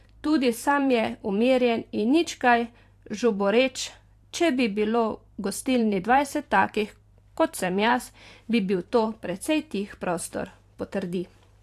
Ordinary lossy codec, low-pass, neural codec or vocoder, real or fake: AAC, 64 kbps; 14.4 kHz; vocoder, 44.1 kHz, 128 mel bands every 512 samples, BigVGAN v2; fake